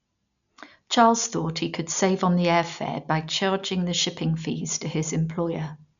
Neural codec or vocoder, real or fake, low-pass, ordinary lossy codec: none; real; 7.2 kHz; none